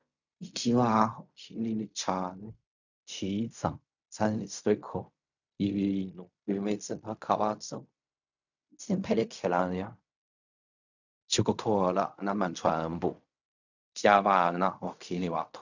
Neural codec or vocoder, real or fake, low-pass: codec, 16 kHz in and 24 kHz out, 0.4 kbps, LongCat-Audio-Codec, fine tuned four codebook decoder; fake; 7.2 kHz